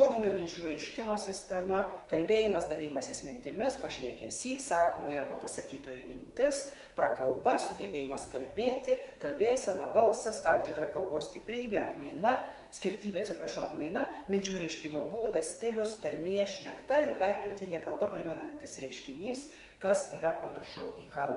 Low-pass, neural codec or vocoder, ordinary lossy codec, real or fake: 10.8 kHz; codec, 24 kHz, 1 kbps, SNAC; MP3, 96 kbps; fake